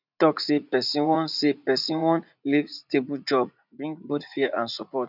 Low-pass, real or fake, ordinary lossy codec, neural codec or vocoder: 5.4 kHz; fake; none; vocoder, 44.1 kHz, 80 mel bands, Vocos